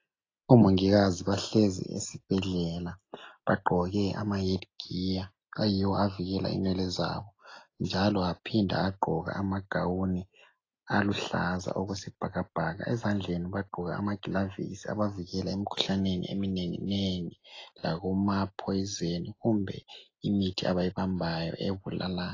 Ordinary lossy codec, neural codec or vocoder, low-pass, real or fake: AAC, 32 kbps; none; 7.2 kHz; real